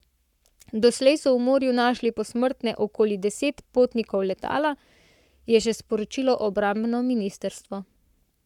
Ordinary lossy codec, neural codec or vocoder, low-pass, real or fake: none; codec, 44.1 kHz, 7.8 kbps, Pupu-Codec; 19.8 kHz; fake